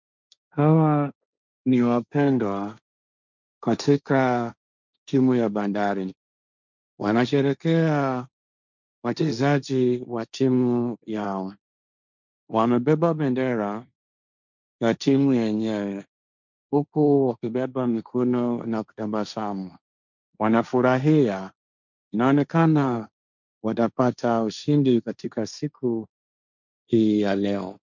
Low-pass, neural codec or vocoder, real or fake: 7.2 kHz; codec, 16 kHz, 1.1 kbps, Voila-Tokenizer; fake